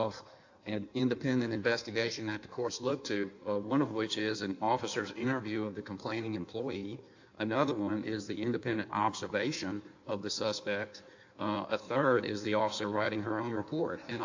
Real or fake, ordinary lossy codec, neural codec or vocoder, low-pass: fake; AAC, 48 kbps; codec, 16 kHz in and 24 kHz out, 1.1 kbps, FireRedTTS-2 codec; 7.2 kHz